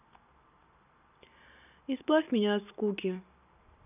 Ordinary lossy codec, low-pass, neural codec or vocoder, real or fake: none; 3.6 kHz; none; real